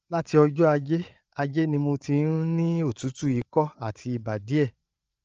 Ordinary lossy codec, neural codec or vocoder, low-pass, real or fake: Opus, 24 kbps; codec, 16 kHz, 8 kbps, FreqCodec, larger model; 7.2 kHz; fake